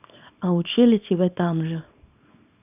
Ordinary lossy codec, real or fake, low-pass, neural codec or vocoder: none; fake; 3.6 kHz; codec, 24 kHz, 0.9 kbps, WavTokenizer, small release